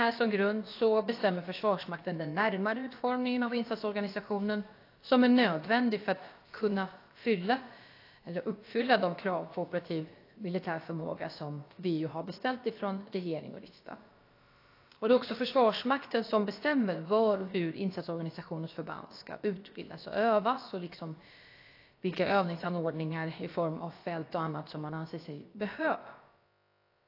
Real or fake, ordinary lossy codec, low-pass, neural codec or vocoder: fake; AAC, 32 kbps; 5.4 kHz; codec, 16 kHz, about 1 kbps, DyCAST, with the encoder's durations